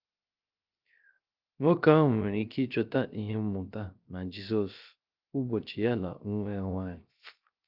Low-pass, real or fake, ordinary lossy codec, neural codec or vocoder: 5.4 kHz; fake; Opus, 24 kbps; codec, 16 kHz, 0.3 kbps, FocalCodec